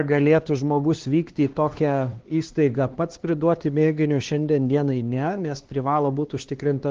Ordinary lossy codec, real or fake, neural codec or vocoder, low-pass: Opus, 16 kbps; fake; codec, 16 kHz, 2 kbps, X-Codec, HuBERT features, trained on LibriSpeech; 7.2 kHz